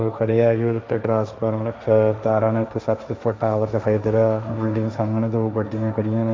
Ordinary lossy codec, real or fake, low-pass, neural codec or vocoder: none; fake; none; codec, 16 kHz, 1.1 kbps, Voila-Tokenizer